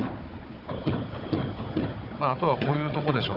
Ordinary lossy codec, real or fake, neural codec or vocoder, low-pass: none; fake; codec, 16 kHz, 4 kbps, FunCodec, trained on Chinese and English, 50 frames a second; 5.4 kHz